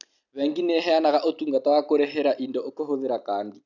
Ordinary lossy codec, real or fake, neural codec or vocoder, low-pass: none; real; none; 7.2 kHz